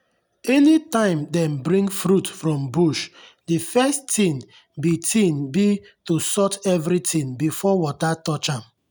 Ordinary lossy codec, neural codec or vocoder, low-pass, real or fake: none; none; none; real